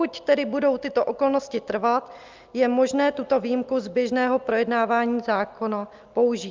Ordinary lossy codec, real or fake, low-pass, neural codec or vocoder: Opus, 32 kbps; real; 7.2 kHz; none